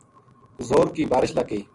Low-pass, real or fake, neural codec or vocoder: 10.8 kHz; real; none